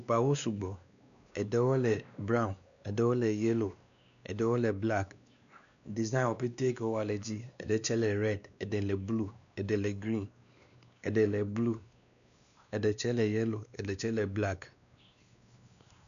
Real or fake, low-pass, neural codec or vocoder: fake; 7.2 kHz; codec, 16 kHz, 2 kbps, X-Codec, WavLM features, trained on Multilingual LibriSpeech